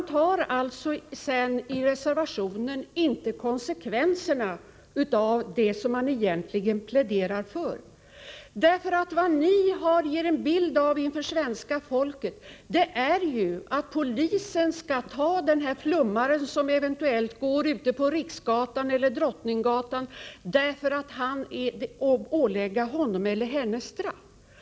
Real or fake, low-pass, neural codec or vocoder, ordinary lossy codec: real; none; none; none